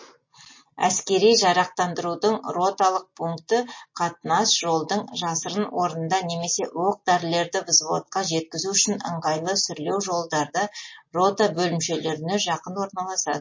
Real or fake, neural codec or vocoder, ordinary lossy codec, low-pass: real; none; MP3, 32 kbps; 7.2 kHz